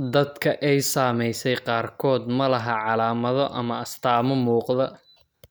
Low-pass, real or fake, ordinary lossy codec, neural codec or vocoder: none; real; none; none